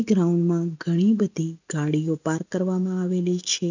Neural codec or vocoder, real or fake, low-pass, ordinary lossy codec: none; real; 7.2 kHz; none